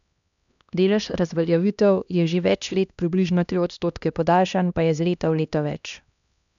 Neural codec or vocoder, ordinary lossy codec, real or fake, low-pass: codec, 16 kHz, 1 kbps, X-Codec, HuBERT features, trained on LibriSpeech; none; fake; 7.2 kHz